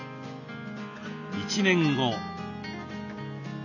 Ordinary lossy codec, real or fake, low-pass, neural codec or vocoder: none; real; 7.2 kHz; none